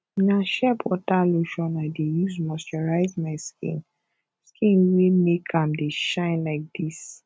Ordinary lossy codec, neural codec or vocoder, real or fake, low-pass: none; none; real; none